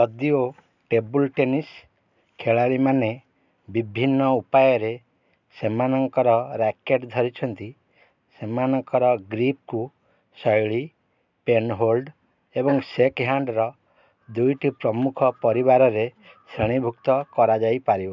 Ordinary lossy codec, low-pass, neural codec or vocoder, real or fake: none; 7.2 kHz; none; real